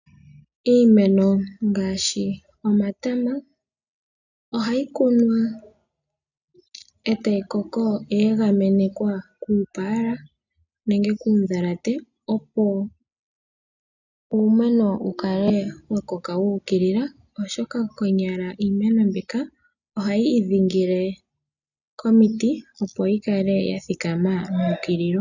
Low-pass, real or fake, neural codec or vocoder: 7.2 kHz; real; none